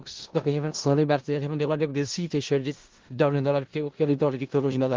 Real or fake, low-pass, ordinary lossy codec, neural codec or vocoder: fake; 7.2 kHz; Opus, 16 kbps; codec, 16 kHz in and 24 kHz out, 0.4 kbps, LongCat-Audio-Codec, four codebook decoder